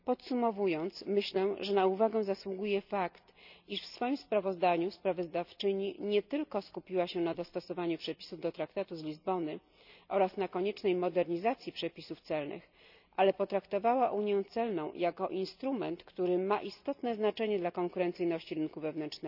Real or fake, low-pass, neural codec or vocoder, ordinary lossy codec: real; 5.4 kHz; none; none